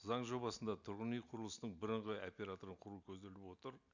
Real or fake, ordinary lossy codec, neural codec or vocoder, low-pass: real; none; none; 7.2 kHz